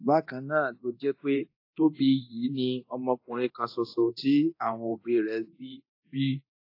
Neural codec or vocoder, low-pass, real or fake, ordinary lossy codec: codec, 24 kHz, 0.9 kbps, DualCodec; 5.4 kHz; fake; AAC, 32 kbps